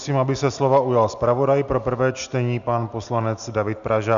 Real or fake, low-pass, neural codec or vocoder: real; 7.2 kHz; none